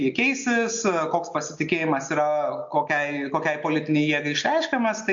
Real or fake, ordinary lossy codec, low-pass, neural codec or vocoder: real; MP3, 48 kbps; 7.2 kHz; none